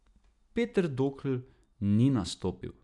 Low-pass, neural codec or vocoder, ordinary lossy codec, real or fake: 10.8 kHz; none; none; real